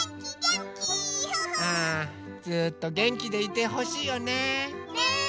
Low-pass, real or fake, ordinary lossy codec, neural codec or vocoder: none; real; none; none